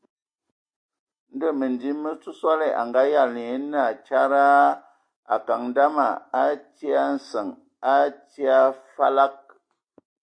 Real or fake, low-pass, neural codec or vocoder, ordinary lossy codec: real; 9.9 kHz; none; AAC, 64 kbps